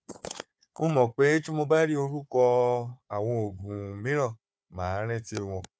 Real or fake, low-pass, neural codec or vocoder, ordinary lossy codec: fake; none; codec, 16 kHz, 4 kbps, FunCodec, trained on Chinese and English, 50 frames a second; none